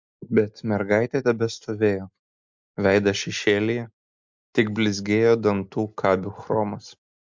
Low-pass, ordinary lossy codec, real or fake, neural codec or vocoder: 7.2 kHz; MP3, 64 kbps; real; none